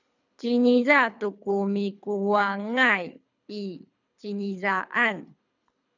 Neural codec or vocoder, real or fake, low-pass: codec, 24 kHz, 3 kbps, HILCodec; fake; 7.2 kHz